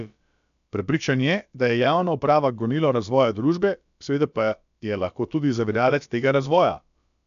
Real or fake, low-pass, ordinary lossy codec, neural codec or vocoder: fake; 7.2 kHz; none; codec, 16 kHz, about 1 kbps, DyCAST, with the encoder's durations